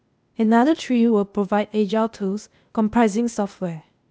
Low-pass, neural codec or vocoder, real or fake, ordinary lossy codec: none; codec, 16 kHz, 0.8 kbps, ZipCodec; fake; none